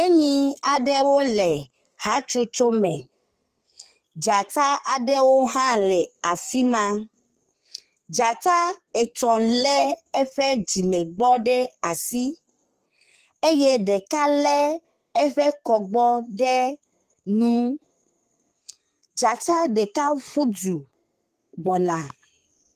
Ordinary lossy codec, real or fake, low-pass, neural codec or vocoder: Opus, 24 kbps; fake; 14.4 kHz; codec, 32 kHz, 1.9 kbps, SNAC